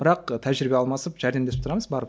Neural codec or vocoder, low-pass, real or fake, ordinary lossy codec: none; none; real; none